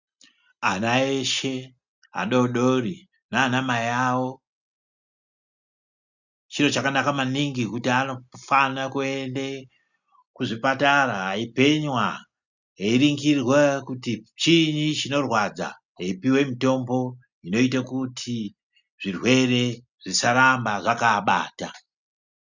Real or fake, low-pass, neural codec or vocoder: real; 7.2 kHz; none